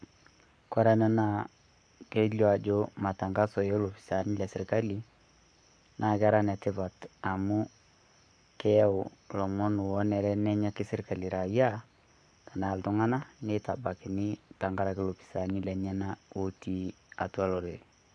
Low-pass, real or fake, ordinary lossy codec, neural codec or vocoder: 9.9 kHz; fake; none; codec, 44.1 kHz, 7.8 kbps, Pupu-Codec